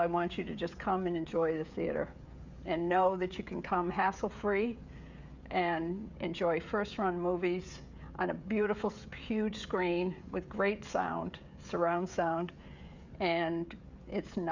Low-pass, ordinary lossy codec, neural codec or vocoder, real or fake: 7.2 kHz; Opus, 64 kbps; codec, 16 kHz, 16 kbps, FreqCodec, smaller model; fake